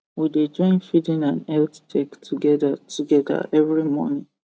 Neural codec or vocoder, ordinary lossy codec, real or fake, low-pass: none; none; real; none